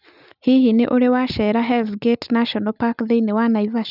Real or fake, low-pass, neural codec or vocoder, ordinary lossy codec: real; 5.4 kHz; none; none